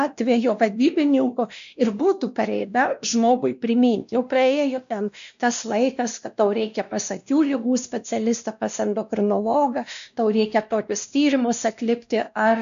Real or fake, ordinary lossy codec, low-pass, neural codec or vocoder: fake; MP3, 64 kbps; 7.2 kHz; codec, 16 kHz, 1 kbps, X-Codec, WavLM features, trained on Multilingual LibriSpeech